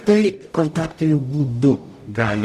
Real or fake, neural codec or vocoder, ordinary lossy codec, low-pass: fake; codec, 44.1 kHz, 0.9 kbps, DAC; Opus, 64 kbps; 14.4 kHz